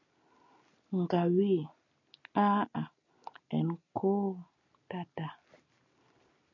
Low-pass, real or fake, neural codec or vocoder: 7.2 kHz; real; none